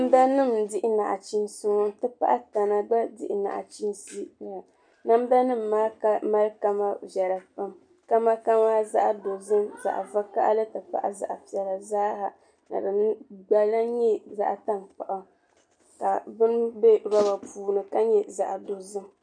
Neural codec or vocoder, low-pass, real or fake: none; 9.9 kHz; real